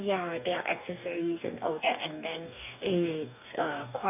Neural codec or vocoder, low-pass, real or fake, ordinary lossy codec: codec, 44.1 kHz, 2.6 kbps, DAC; 3.6 kHz; fake; none